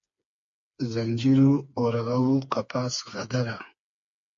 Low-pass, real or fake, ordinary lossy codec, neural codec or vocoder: 7.2 kHz; fake; MP3, 48 kbps; codec, 16 kHz, 4 kbps, FreqCodec, smaller model